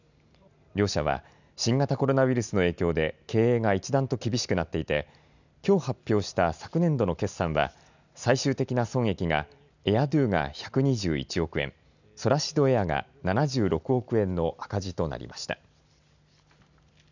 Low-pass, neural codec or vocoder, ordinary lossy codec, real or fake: 7.2 kHz; none; none; real